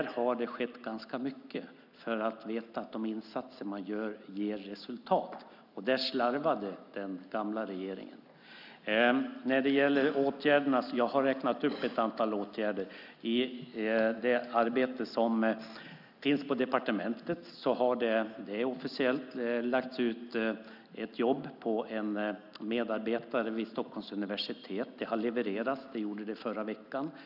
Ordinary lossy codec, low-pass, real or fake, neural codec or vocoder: none; 5.4 kHz; real; none